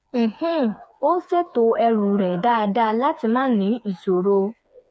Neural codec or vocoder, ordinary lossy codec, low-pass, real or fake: codec, 16 kHz, 4 kbps, FreqCodec, smaller model; none; none; fake